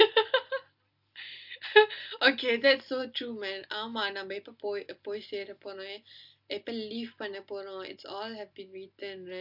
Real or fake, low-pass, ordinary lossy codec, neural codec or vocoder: real; 5.4 kHz; AAC, 48 kbps; none